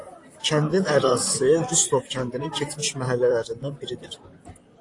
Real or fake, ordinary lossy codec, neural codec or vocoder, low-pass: fake; AAC, 48 kbps; vocoder, 44.1 kHz, 128 mel bands, Pupu-Vocoder; 10.8 kHz